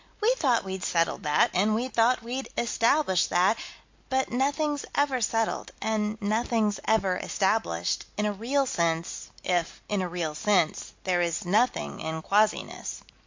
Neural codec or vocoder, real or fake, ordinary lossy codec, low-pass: none; real; MP3, 48 kbps; 7.2 kHz